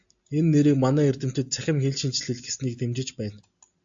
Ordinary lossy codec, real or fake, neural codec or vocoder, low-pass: AAC, 64 kbps; real; none; 7.2 kHz